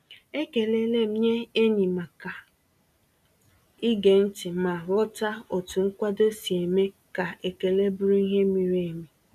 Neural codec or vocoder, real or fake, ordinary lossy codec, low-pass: none; real; none; 14.4 kHz